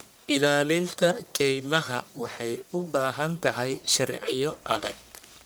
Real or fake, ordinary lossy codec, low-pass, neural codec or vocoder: fake; none; none; codec, 44.1 kHz, 1.7 kbps, Pupu-Codec